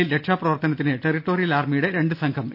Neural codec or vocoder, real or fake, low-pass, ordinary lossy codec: none; real; 5.4 kHz; none